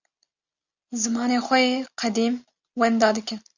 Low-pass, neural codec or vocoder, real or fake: 7.2 kHz; none; real